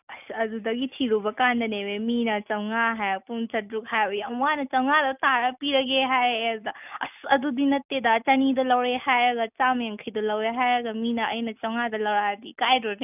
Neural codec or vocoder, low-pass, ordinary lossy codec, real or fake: none; 3.6 kHz; none; real